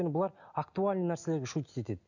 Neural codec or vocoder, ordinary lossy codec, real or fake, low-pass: none; none; real; 7.2 kHz